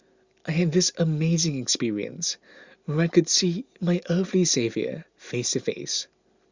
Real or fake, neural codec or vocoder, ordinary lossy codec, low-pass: real; none; Opus, 64 kbps; 7.2 kHz